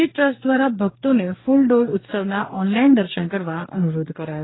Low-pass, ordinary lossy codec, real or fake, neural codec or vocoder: 7.2 kHz; AAC, 16 kbps; fake; codec, 44.1 kHz, 2.6 kbps, DAC